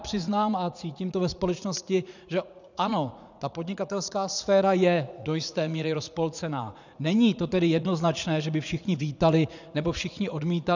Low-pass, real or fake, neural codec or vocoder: 7.2 kHz; fake; vocoder, 44.1 kHz, 80 mel bands, Vocos